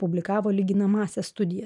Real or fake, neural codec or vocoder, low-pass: real; none; 10.8 kHz